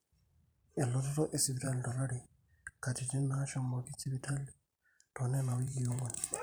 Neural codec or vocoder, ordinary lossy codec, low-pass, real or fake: vocoder, 44.1 kHz, 128 mel bands every 512 samples, BigVGAN v2; none; none; fake